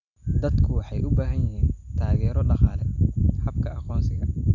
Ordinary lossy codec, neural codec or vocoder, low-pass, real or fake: none; none; 7.2 kHz; real